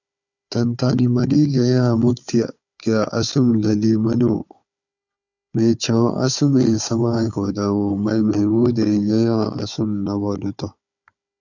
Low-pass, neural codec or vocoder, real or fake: 7.2 kHz; codec, 16 kHz, 4 kbps, FunCodec, trained on Chinese and English, 50 frames a second; fake